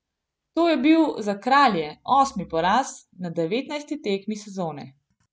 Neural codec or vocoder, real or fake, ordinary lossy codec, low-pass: none; real; none; none